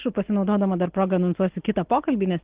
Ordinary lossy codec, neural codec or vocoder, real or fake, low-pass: Opus, 16 kbps; none; real; 3.6 kHz